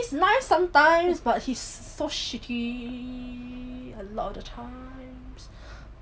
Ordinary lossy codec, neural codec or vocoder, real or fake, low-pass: none; none; real; none